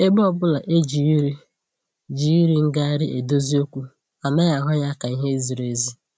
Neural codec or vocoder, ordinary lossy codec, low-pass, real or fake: none; none; none; real